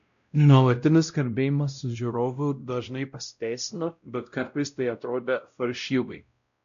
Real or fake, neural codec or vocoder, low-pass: fake; codec, 16 kHz, 0.5 kbps, X-Codec, WavLM features, trained on Multilingual LibriSpeech; 7.2 kHz